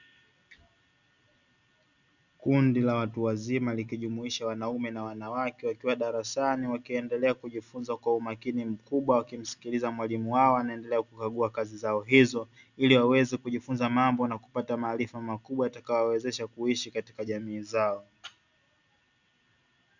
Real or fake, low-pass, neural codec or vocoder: real; 7.2 kHz; none